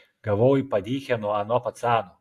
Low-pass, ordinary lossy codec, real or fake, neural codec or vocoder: 14.4 kHz; AAC, 64 kbps; real; none